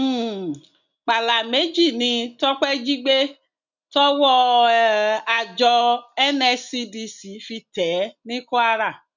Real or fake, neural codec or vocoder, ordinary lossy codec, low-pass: real; none; none; 7.2 kHz